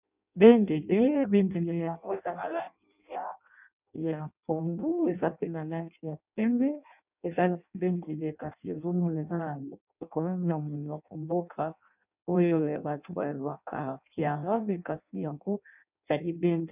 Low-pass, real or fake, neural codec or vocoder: 3.6 kHz; fake; codec, 16 kHz in and 24 kHz out, 0.6 kbps, FireRedTTS-2 codec